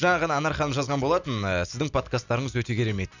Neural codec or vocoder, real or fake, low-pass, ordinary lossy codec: none; real; 7.2 kHz; none